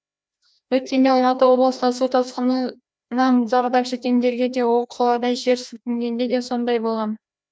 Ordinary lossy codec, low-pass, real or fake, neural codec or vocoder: none; none; fake; codec, 16 kHz, 1 kbps, FreqCodec, larger model